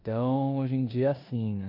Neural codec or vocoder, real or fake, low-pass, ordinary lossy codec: codec, 16 kHz in and 24 kHz out, 0.9 kbps, LongCat-Audio-Codec, four codebook decoder; fake; 5.4 kHz; none